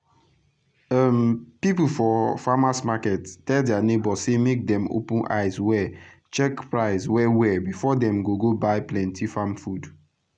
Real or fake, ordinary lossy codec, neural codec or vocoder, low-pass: real; none; none; 9.9 kHz